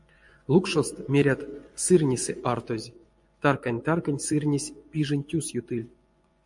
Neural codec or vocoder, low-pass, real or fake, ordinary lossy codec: vocoder, 24 kHz, 100 mel bands, Vocos; 10.8 kHz; fake; MP3, 64 kbps